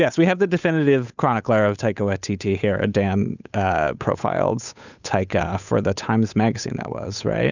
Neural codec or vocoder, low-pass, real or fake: codec, 16 kHz, 8 kbps, FunCodec, trained on Chinese and English, 25 frames a second; 7.2 kHz; fake